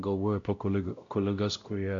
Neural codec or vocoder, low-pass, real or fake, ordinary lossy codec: codec, 16 kHz, 0.5 kbps, X-Codec, WavLM features, trained on Multilingual LibriSpeech; 7.2 kHz; fake; AAC, 64 kbps